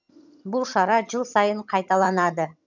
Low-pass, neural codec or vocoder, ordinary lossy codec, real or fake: 7.2 kHz; vocoder, 22.05 kHz, 80 mel bands, HiFi-GAN; none; fake